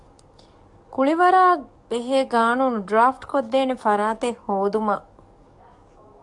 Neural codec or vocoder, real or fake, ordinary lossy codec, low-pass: codec, 44.1 kHz, 7.8 kbps, DAC; fake; AAC, 64 kbps; 10.8 kHz